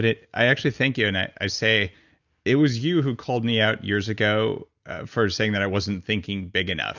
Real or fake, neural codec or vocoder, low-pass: real; none; 7.2 kHz